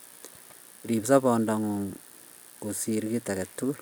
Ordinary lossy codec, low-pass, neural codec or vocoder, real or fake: none; none; none; real